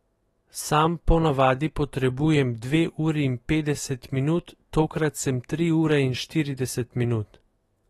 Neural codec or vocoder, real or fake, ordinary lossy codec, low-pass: autoencoder, 48 kHz, 128 numbers a frame, DAC-VAE, trained on Japanese speech; fake; AAC, 32 kbps; 19.8 kHz